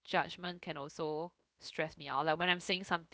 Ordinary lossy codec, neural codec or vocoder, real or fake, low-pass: none; codec, 16 kHz, 0.7 kbps, FocalCodec; fake; none